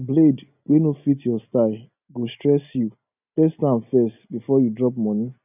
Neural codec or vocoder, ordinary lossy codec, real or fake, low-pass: none; none; real; 3.6 kHz